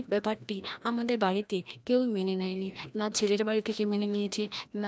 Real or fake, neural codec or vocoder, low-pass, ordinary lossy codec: fake; codec, 16 kHz, 1 kbps, FreqCodec, larger model; none; none